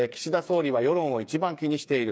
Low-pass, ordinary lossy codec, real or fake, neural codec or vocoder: none; none; fake; codec, 16 kHz, 4 kbps, FreqCodec, smaller model